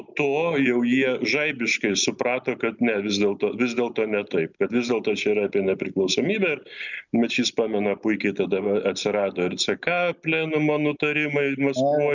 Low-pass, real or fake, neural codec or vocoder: 7.2 kHz; real; none